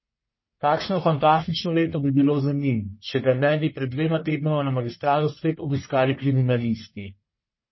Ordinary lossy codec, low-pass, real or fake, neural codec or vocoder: MP3, 24 kbps; 7.2 kHz; fake; codec, 44.1 kHz, 1.7 kbps, Pupu-Codec